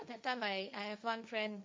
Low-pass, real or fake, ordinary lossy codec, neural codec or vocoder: none; fake; none; codec, 16 kHz, 1.1 kbps, Voila-Tokenizer